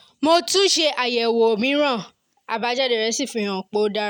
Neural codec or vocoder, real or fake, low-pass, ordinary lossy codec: none; real; 19.8 kHz; none